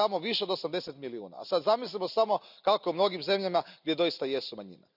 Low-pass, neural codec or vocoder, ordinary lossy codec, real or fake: 5.4 kHz; none; none; real